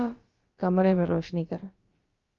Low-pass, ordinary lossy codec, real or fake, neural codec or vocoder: 7.2 kHz; Opus, 32 kbps; fake; codec, 16 kHz, about 1 kbps, DyCAST, with the encoder's durations